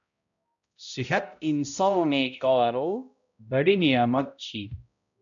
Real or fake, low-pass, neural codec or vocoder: fake; 7.2 kHz; codec, 16 kHz, 0.5 kbps, X-Codec, HuBERT features, trained on balanced general audio